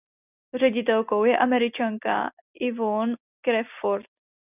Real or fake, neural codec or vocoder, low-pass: real; none; 3.6 kHz